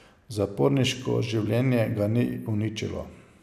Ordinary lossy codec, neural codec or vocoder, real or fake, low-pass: none; none; real; 14.4 kHz